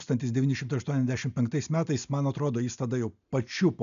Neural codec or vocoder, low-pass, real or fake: none; 7.2 kHz; real